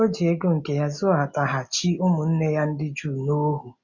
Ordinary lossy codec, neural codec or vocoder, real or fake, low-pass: none; none; real; 7.2 kHz